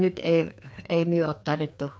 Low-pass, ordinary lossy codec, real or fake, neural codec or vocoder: none; none; fake; codec, 16 kHz, 2 kbps, FreqCodec, larger model